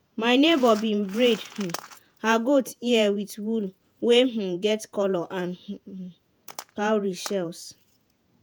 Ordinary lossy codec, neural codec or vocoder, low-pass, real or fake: none; vocoder, 48 kHz, 128 mel bands, Vocos; none; fake